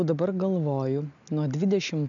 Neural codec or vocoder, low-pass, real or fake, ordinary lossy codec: none; 7.2 kHz; real; AAC, 64 kbps